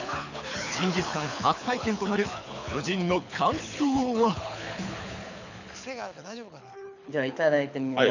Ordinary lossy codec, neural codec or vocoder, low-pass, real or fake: none; codec, 24 kHz, 6 kbps, HILCodec; 7.2 kHz; fake